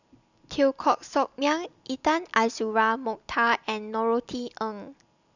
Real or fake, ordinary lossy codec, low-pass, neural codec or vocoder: real; none; 7.2 kHz; none